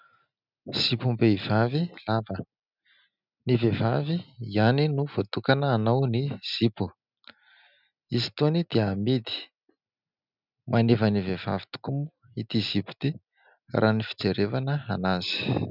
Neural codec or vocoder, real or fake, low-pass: none; real; 5.4 kHz